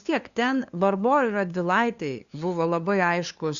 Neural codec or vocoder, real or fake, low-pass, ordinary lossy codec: codec, 16 kHz, 2 kbps, FunCodec, trained on LibriTTS, 25 frames a second; fake; 7.2 kHz; Opus, 64 kbps